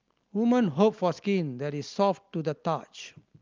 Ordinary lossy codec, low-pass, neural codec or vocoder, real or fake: Opus, 24 kbps; 7.2 kHz; none; real